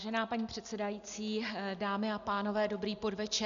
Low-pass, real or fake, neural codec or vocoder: 7.2 kHz; real; none